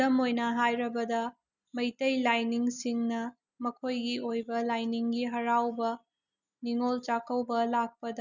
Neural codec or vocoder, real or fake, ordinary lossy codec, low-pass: none; real; none; 7.2 kHz